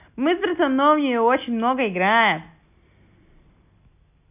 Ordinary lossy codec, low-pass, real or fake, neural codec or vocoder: none; 3.6 kHz; real; none